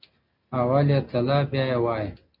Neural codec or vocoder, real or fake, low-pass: none; real; 5.4 kHz